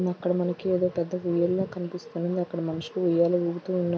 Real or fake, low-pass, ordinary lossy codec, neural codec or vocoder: real; none; none; none